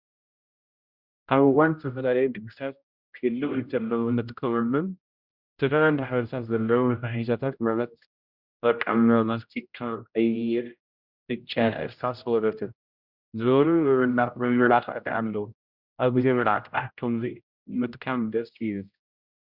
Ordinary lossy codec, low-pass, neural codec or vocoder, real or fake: Opus, 64 kbps; 5.4 kHz; codec, 16 kHz, 0.5 kbps, X-Codec, HuBERT features, trained on general audio; fake